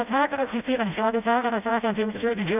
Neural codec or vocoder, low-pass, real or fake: codec, 16 kHz, 0.5 kbps, FreqCodec, smaller model; 3.6 kHz; fake